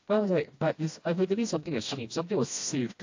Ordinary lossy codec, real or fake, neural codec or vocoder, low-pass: AAC, 48 kbps; fake; codec, 16 kHz, 1 kbps, FreqCodec, smaller model; 7.2 kHz